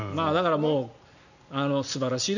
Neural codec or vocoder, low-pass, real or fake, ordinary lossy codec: none; 7.2 kHz; real; none